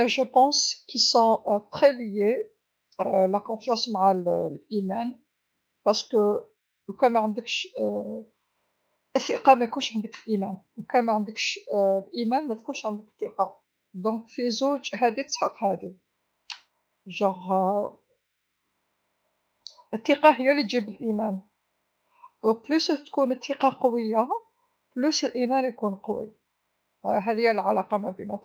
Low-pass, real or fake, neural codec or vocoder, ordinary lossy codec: none; fake; autoencoder, 48 kHz, 32 numbers a frame, DAC-VAE, trained on Japanese speech; none